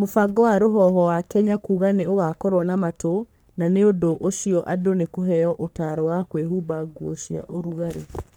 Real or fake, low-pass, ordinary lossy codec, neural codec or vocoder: fake; none; none; codec, 44.1 kHz, 3.4 kbps, Pupu-Codec